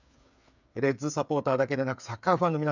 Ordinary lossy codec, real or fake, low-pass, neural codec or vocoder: none; fake; 7.2 kHz; codec, 16 kHz, 8 kbps, FreqCodec, smaller model